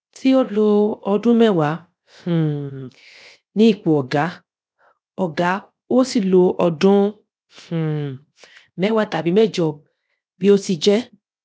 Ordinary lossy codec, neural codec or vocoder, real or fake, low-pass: none; codec, 16 kHz, 0.7 kbps, FocalCodec; fake; none